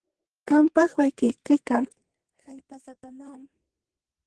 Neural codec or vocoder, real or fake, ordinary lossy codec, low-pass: codec, 32 kHz, 1.9 kbps, SNAC; fake; Opus, 16 kbps; 10.8 kHz